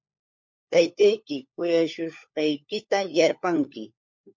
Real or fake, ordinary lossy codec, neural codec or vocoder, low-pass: fake; MP3, 48 kbps; codec, 16 kHz, 4 kbps, FunCodec, trained on LibriTTS, 50 frames a second; 7.2 kHz